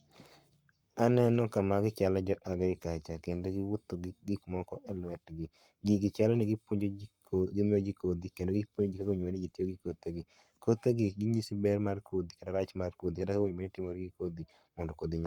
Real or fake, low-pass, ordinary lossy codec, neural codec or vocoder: fake; 19.8 kHz; Opus, 64 kbps; codec, 44.1 kHz, 7.8 kbps, Pupu-Codec